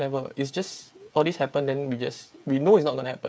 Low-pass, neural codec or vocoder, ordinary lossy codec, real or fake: none; codec, 16 kHz, 8 kbps, FreqCodec, smaller model; none; fake